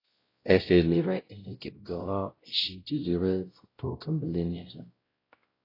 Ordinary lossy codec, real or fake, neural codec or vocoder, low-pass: AAC, 24 kbps; fake; codec, 16 kHz, 0.5 kbps, X-Codec, WavLM features, trained on Multilingual LibriSpeech; 5.4 kHz